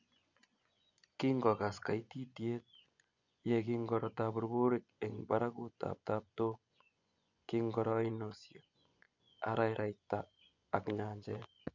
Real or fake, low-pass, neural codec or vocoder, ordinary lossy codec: fake; 7.2 kHz; vocoder, 24 kHz, 100 mel bands, Vocos; none